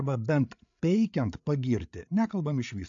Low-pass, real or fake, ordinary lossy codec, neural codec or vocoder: 7.2 kHz; fake; AAC, 64 kbps; codec, 16 kHz, 8 kbps, FreqCodec, larger model